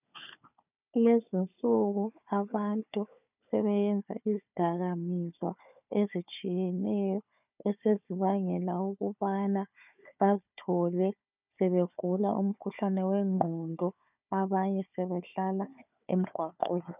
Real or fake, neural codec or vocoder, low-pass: fake; codec, 16 kHz, 4 kbps, FunCodec, trained on Chinese and English, 50 frames a second; 3.6 kHz